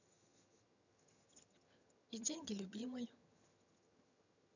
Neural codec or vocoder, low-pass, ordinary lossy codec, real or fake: vocoder, 22.05 kHz, 80 mel bands, HiFi-GAN; 7.2 kHz; none; fake